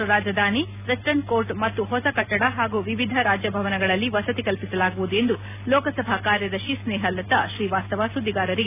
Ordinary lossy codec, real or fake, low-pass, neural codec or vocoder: none; real; 3.6 kHz; none